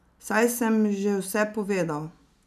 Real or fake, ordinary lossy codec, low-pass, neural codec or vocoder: real; none; 14.4 kHz; none